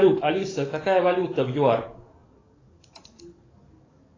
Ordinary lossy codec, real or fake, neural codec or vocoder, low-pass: AAC, 32 kbps; fake; codec, 44.1 kHz, 7.8 kbps, DAC; 7.2 kHz